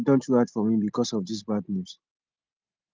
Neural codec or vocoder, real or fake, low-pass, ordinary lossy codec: none; real; 7.2 kHz; Opus, 32 kbps